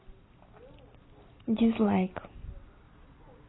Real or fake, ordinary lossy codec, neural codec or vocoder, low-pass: real; AAC, 16 kbps; none; 7.2 kHz